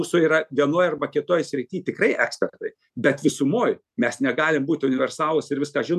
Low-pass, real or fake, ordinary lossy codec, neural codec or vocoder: 14.4 kHz; real; MP3, 96 kbps; none